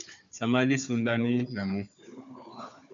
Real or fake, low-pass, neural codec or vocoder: fake; 7.2 kHz; codec, 16 kHz, 4 kbps, FunCodec, trained on Chinese and English, 50 frames a second